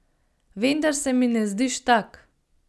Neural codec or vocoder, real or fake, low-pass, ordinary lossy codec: none; real; none; none